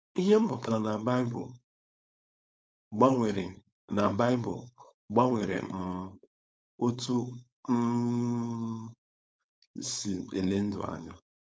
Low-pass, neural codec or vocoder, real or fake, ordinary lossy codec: none; codec, 16 kHz, 4.8 kbps, FACodec; fake; none